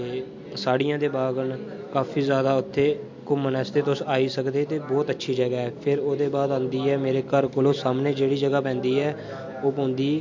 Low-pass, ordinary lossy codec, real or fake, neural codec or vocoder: 7.2 kHz; MP3, 48 kbps; real; none